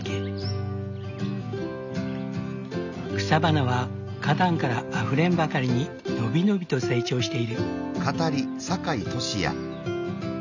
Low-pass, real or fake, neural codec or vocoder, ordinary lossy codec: 7.2 kHz; real; none; none